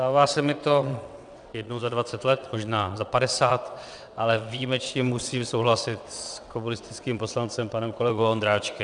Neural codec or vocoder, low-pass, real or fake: vocoder, 22.05 kHz, 80 mel bands, WaveNeXt; 9.9 kHz; fake